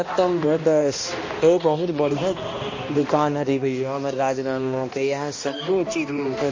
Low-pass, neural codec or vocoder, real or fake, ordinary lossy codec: 7.2 kHz; codec, 16 kHz, 1 kbps, X-Codec, HuBERT features, trained on balanced general audio; fake; MP3, 32 kbps